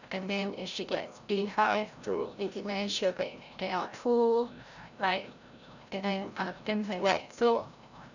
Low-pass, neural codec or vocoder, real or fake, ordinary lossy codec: 7.2 kHz; codec, 16 kHz, 0.5 kbps, FreqCodec, larger model; fake; none